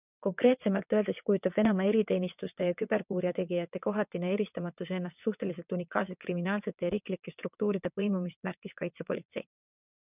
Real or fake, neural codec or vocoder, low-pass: fake; vocoder, 22.05 kHz, 80 mel bands, WaveNeXt; 3.6 kHz